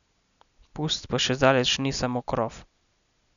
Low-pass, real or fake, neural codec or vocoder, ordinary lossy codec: 7.2 kHz; real; none; none